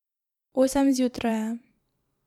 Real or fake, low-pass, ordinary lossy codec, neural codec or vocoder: fake; 19.8 kHz; none; vocoder, 44.1 kHz, 128 mel bands every 512 samples, BigVGAN v2